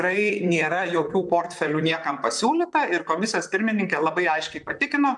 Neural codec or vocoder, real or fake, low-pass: vocoder, 44.1 kHz, 128 mel bands, Pupu-Vocoder; fake; 10.8 kHz